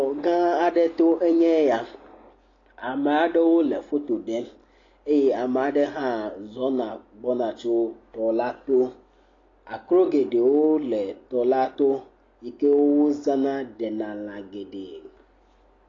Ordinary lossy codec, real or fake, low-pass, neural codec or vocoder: AAC, 32 kbps; real; 7.2 kHz; none